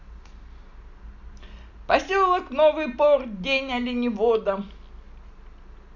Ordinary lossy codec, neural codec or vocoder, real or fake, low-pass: none; none; real; 7.2 kHz